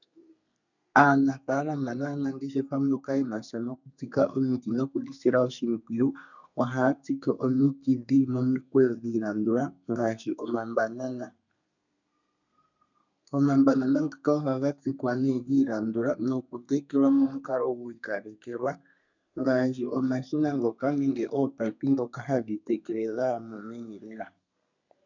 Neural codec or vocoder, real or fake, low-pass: codec, 32 kHz, 1.9 kbps, SNAC; fake; 7.2 kHz